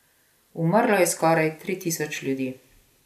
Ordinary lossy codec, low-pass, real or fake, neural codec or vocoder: none; 14.4 kHz; real; none